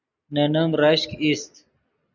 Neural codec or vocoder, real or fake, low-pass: none; real; 7.2 kHz